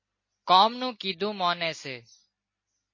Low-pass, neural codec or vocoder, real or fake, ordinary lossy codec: 7.2 kHz; none; real; MP3, 32 kbps